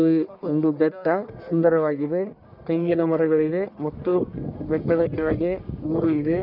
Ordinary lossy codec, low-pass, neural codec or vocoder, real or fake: none; 5.4 kHz; codec, 44.1 kHz, 1.7 kbps, Pupu-Codec; fake